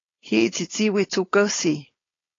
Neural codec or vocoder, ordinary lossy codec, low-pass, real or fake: codec, 16 kHz, 4.8 kbps, FACodec; AAC, 32 kbps; 7.2 kHz; fake